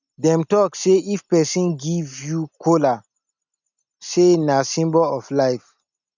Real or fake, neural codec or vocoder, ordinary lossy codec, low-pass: real; none; none; 7.2 kHz